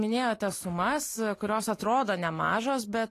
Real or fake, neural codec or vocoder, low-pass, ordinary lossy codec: real; none; 14.4 kHz; AAC, 48 kbps